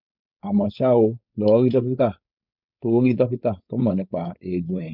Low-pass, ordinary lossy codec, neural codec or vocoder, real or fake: 5.4 kHz; none; codec, 16 kHz, 4.8 kbps, FACodec; fake